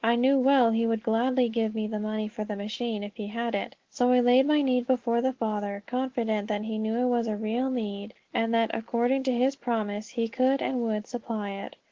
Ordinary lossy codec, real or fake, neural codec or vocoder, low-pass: Opus, 16 kbps; real; none; 7.2 kHz